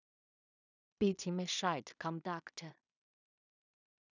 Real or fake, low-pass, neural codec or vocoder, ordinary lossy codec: fake; 7.2 kHz; codec, 16 kHz in and 24 kHz out, 0.4 kbps, LongCat-Audio-Codec, two codebook decoder; none